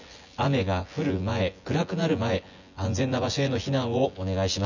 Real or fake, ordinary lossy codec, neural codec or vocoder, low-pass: fake; none; vocoder, 24 kHz, 100 mel bands, Vocos; 7.2 kHz